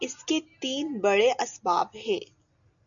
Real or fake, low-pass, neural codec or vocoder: real; 7.2 kHz; none